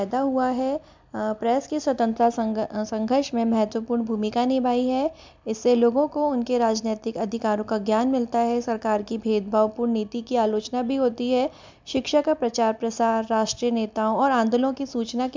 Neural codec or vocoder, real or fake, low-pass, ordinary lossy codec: none; real; 7.2 kHz; MP3, 64 kbps